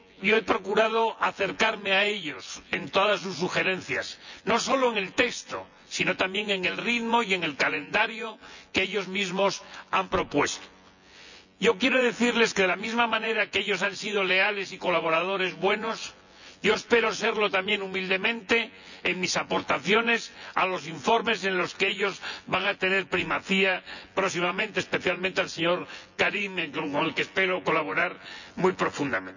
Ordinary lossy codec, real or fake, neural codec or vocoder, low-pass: none; fake; vocoder, 24 kHz, 100 mel bands, Vocos; 7.2 kHz